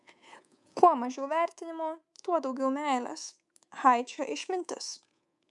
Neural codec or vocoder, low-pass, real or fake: codec, 24 kHz, 3.1 kbps, DualCodec; 10.8 kHz; fake